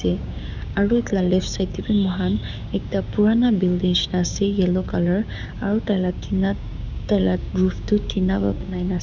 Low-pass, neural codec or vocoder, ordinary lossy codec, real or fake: 7.2 kHz; codec, 16 kHz, 6 kbps, DAC; none; fake